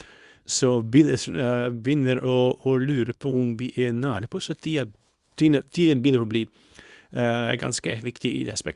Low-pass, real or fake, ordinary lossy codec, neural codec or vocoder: 10.8 kHz; fake; Opus, 64 kbps; codec, 24 kHz, 0.9 kbps, WavTokenizer, small release